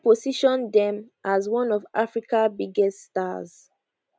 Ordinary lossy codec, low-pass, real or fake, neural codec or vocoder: none; none; real; none